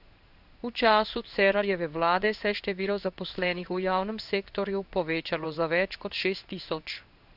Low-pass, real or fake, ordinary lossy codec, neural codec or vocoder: 5.4 kHz; fake; none; codec, 16 kHz in and 24 kHz out, 1 kbps, XY-Tokenizer